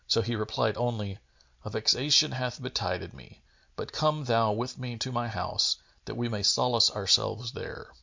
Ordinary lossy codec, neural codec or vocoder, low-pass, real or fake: MP3, 64 kbps; none; 7.2 kHz; real